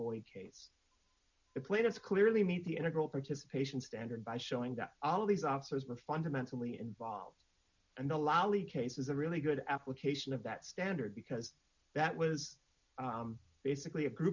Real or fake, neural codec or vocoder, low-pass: real; none; 7.2 kHz